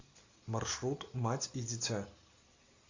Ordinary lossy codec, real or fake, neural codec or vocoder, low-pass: AAC, 48 kbps; real; none; 7.2 kHz